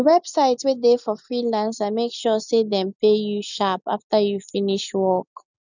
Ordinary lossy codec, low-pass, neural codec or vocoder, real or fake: none; 7.2 kHz; none; real